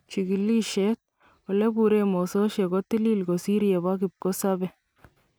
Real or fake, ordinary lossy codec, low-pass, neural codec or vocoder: real; none; none; none